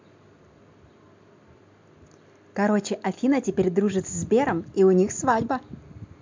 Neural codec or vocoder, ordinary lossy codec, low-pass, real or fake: none; MP3, 64 kbps; 7.2 kHz; real